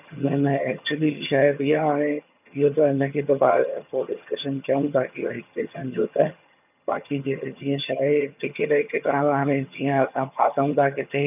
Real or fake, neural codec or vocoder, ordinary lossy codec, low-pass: fake; vocoder, 22.05 kHz, 80 mel bands, HiFi-GAN; none; 3.6 kHz